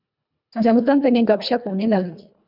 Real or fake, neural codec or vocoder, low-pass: fake; codec, 24 kHz, 1.5 kbps, HILCodec; 5.4 kHz